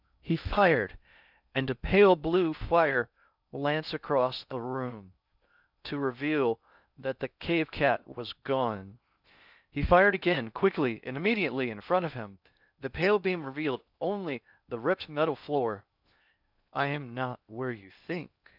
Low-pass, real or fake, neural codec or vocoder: 5.4 kHz; fake; codec, 16 kHz in and 24 kHz out, 0.6 kbps, FocalCodec, streaming, 2048 codes